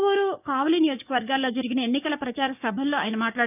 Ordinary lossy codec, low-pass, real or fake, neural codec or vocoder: none; 3.6 kHz; fake; codec, 16 kHz, 6 kbps, DAC